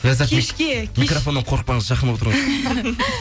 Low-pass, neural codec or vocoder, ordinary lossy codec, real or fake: none; none; none; real